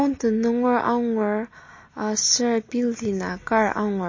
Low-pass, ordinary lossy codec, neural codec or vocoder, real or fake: 7.2 kHz; MP3, 32 kbps; none; real